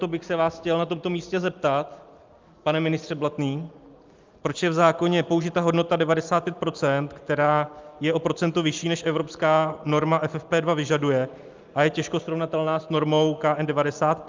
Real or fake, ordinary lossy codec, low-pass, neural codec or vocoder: real; Opus, 24 kbps; 7.2 kHz; none